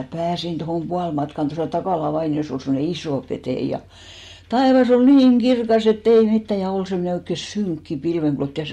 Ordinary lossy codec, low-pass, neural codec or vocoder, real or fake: MP3, 64 kbps; 19.8 kHz; none; real